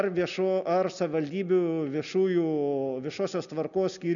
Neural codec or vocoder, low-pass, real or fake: none; 7.2 kHz; real